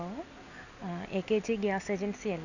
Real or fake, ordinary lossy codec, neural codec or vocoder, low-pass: real; none; none; 7.2 kHz